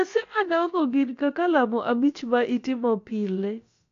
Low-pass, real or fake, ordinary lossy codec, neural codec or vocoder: 7.2 kHz; fake; AAC, 48 kbps; codec, 16 kHz, about 1 kbps, DyCAST, with the encoder's durations